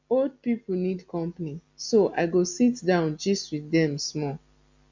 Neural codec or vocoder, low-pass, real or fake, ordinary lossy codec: none; 7.2 kHz; real; MP3, 64 kbps